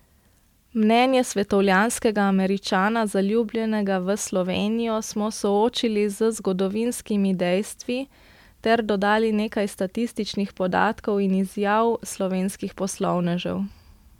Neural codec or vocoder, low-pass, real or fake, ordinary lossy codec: none; 19.8 kHz; real; MP3, 96 kbps